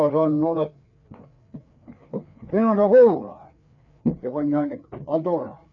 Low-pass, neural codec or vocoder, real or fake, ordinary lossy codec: 7.2 kHz; codec, 16 kHz, 4 kbps, FreqCodec, larger model; fake; none